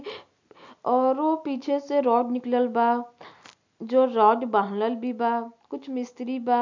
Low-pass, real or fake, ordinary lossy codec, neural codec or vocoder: 7.2 kHz; real; MP3, 64 kbps; none